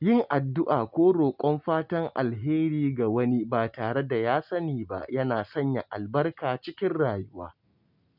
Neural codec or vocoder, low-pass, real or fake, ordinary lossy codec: none; 5.4 kHz; real; none